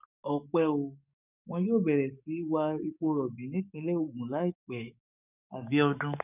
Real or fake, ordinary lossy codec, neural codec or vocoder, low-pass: fake; none; codec, 44.1 kHz, 7.8 kbps, DAC; 3.6 kHz